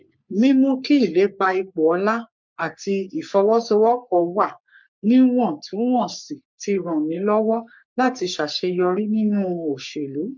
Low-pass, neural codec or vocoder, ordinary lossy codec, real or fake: 7.2 kHz; codec, 44.1 kHz, 3.4 kbps, Pupu-Codec; MP3, 64 kbps; fake